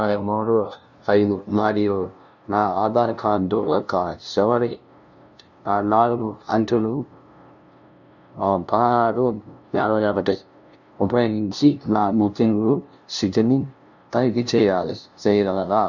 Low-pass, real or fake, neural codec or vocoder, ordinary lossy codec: 7.2 kHz; fake; codec, 16 kHz, 0.5 kbps, FunCodec, trained on LibriTTS, 25 frames a second; none